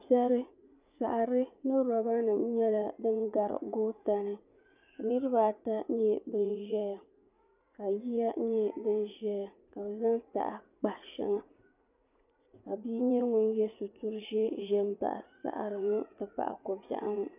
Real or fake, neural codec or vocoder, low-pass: fake; vocoder, 44.1 kHz, 80 mel bands, Vocos; 3.6 kHz